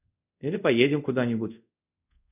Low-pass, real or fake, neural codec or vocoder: 3.6 kHz; fake; codec, 24 kHz, 0.5 kbps, DualCodec